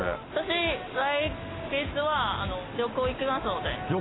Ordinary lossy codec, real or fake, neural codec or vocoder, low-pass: AAC, 16 kbps; real; none; 7.2 kHz